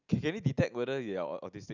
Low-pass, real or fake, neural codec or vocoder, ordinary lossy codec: 7.2 kHz; real; none; none